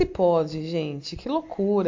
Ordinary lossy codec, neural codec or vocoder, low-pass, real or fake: MP3, 48 kbps; none; 7.2 kHz; real